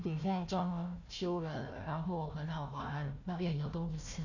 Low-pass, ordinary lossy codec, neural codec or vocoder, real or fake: 7.2 kHz; none; codec, 16 kHz, 1 kbps, FunCodec, trained on Chinese and English, 50 frames a second; fake